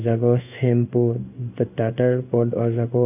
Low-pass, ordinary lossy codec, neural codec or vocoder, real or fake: 3.6 kHz; none; codec, 16 kHz in and 24 kHz out, 1 kbps, XY-Tokenizer; fake